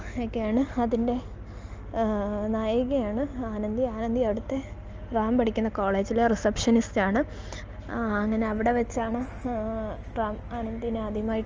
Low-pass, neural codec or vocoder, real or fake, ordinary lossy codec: 7.2 kHz; none; real; Opus, 24 kbps